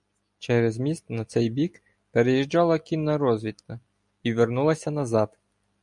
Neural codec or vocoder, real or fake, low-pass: none; real; 10.8 kHz